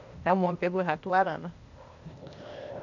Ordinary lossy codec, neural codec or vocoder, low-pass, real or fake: none; codec, 16 kHz, 0.8 kbps, ZipCodec; 7.2 kHz; fake